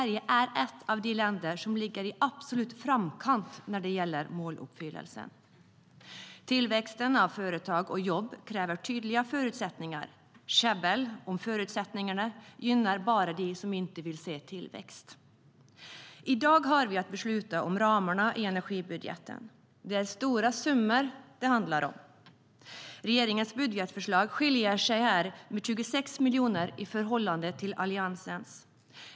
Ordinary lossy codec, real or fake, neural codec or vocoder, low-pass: none; real; none; none